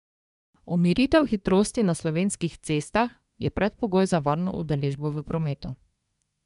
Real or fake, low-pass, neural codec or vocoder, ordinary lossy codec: fake; 10.8 kHz; codec, 24 kHz, 1 kbps, SNAC; none